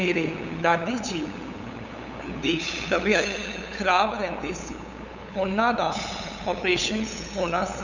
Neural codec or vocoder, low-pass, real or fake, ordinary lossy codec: codec, 16 kHz, 8 kbps, FunCodec, trained on LibriTTS, 25 frames a second; 7.2 kHz; fake; none